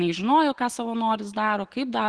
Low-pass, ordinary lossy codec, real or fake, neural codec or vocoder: 9.9 kHz; Opus, 16 kbps; real; none